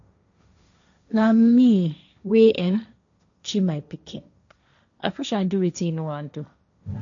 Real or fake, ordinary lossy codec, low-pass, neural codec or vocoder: fake; none; 7.2 kHz; codec, 16 kHz, 1.1 kbps, Voila-Tokenizer